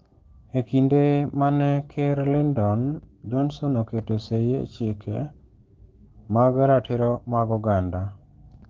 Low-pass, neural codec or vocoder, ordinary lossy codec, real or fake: 7.2 kHz; codec, 16 kHz, 6 kbps, DAC; Opus, 16 kbps; fake